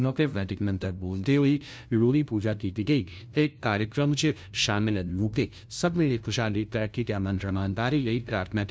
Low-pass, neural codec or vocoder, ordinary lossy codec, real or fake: none; codec, 16 kHz, 0.5 kbps, FunCodec, trained on LibriTTS, 25 frames a second; none; fake